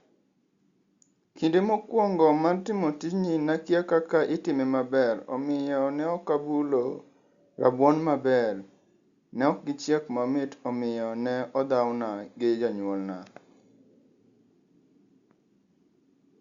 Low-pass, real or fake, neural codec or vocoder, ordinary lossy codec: 7.2 kHz; real; none; Opus, 64 kbps